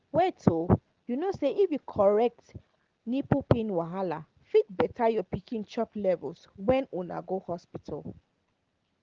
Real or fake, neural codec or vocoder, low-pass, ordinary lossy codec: real; none; 7.2 kHz; Opus, 16 kbps